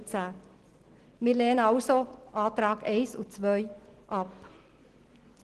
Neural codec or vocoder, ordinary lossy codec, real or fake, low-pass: none; Opus, 16 kbps; real; 10.8 kHz